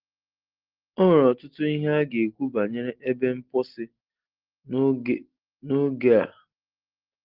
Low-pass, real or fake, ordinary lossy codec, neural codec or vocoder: 5.4 kHz; real; Opus, 16 kbps; none